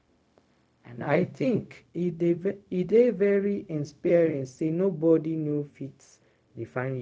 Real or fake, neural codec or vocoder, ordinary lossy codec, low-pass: fake; codec, 16 kHz, 0.4 kbps, LongCat-Audio-Codec; none; none